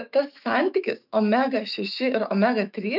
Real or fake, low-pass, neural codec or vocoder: fake; 5.4 kHz; autoencoder, 48 kHz, 128 numbers a frame, DAC-VAE, trained on Japanese speech